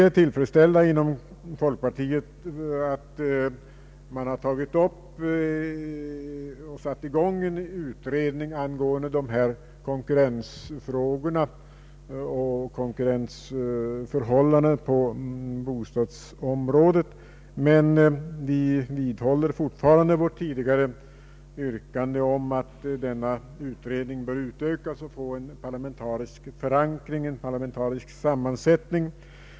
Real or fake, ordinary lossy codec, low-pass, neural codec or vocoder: real; none; none; none